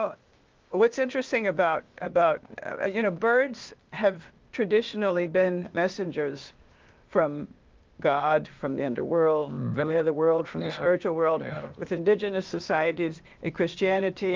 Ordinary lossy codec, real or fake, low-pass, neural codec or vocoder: Opus, 24 kbps; fake; 7.2 kHz; codec, 16 kHz, 0.8 kbps, ZipCodec